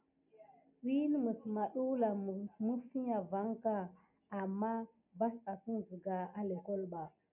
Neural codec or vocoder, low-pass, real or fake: none; 3.6 kHz; real